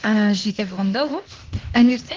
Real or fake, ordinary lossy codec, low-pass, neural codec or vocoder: fake; Opus, 16 kbps; 7.2 kHz; codec, 16 kHz, 0.8 kbps, ZipCodec